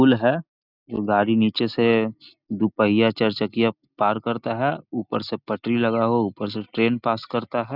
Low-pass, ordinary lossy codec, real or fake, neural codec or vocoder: 5.4 kHz; none; real; none